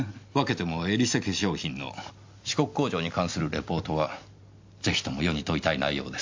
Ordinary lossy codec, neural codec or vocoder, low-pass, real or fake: MP3, 48 kbps; none; 7.2 kHz; real